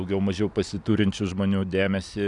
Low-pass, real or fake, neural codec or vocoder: 10.8 kHz; real; none